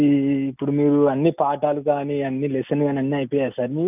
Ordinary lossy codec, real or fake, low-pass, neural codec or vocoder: none; real; 3.6 kHz; none